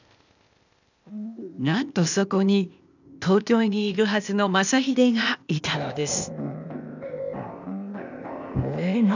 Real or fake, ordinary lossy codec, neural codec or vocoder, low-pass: fake; none; codec, 16 kHz, 0.8 kbps, ZipCodec; 7.2 kHz